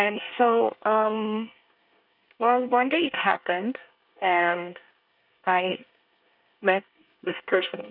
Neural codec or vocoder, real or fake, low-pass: codec, 24 kHz, 1 kbps, SNAC; fake; 5.4 kHz